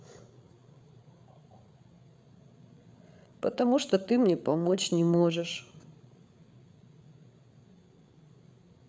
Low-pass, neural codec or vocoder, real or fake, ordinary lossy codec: none; codec, 16 kHz, 8 kbps, FreqCodec, larger model; fake; none